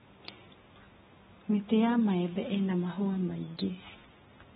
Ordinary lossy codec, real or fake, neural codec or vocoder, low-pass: AAC, 16 kbps; real; none; 19.8 kHz